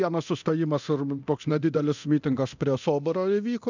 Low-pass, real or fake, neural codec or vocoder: 7.2 kHz; fake; codec, 24 kHz, 0.9 kbps, DualCodec